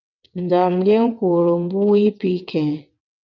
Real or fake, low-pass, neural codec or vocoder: fake; 7.2 kHz; vocoder, 22.05 kHz, 80 mel bands, WaveNeXt